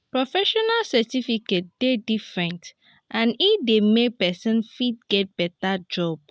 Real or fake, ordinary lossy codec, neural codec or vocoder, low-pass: real; none; none; none